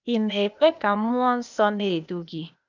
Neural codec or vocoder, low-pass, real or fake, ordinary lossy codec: codec, 16 kHz, 0.8 kbps, ZipCodec; 7.2 kHz; fake; none